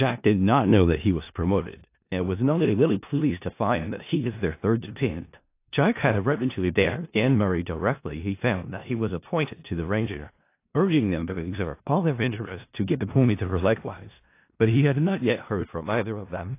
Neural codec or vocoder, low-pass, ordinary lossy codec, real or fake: codec, 16 kHz in and 24 kHz out, 0.4 kbps, LongCat-Audio-Codec, four codebook decoder; 3.6 kHz; AAC, 24 kbps; fake